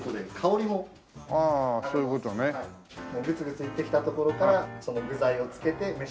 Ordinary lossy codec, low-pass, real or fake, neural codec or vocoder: none; none; real; none